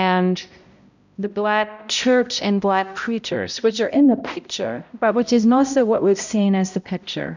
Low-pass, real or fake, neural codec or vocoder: 7.2 kHz; fake; codec, 16 kHz, 0.5 kbps, X-Codec, HuBERT features, trained on balanced general audio